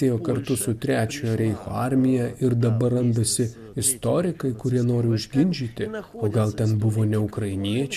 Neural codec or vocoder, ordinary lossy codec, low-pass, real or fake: none; MP3, 96 kbps; 14.4 kHz; real